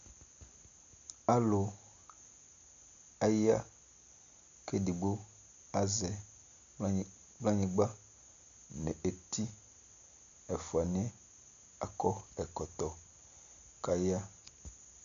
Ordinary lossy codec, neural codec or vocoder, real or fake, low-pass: MP3, 96 kbps; none; real; 7.2 kHz